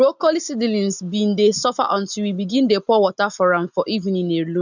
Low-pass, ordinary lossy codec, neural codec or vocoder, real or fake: 7.2 kHz; none; none; real